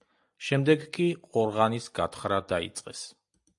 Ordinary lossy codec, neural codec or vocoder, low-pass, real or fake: AAC, 64 kbps; none; 10.8 kHz; real